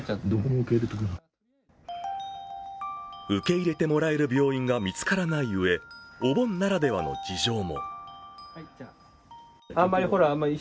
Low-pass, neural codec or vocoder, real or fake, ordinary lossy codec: none; none; real; none